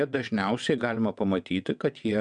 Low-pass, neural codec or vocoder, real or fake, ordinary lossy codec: 9.9 kHz; vocoder, 22.05 kHz, 80 mel bands, WaveNeXt; fake; MP3, 96 kbps